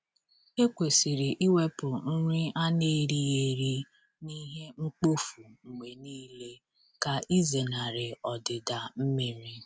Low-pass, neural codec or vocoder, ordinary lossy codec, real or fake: none; none; none; real